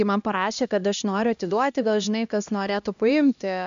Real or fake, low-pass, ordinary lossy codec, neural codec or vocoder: fake; 7.2 kHz; AAC, 96 kbps; codec, 16 kHz, 1 kbps, X-Codec, HuBERT features, trained on LibriSpeech